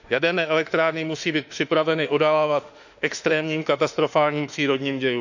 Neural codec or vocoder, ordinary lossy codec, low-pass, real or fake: autoencoder, 48 kHz, 32 numbers a frame, DAC-VAE, trained on Japanese speech; none; 7.2 kHz; fake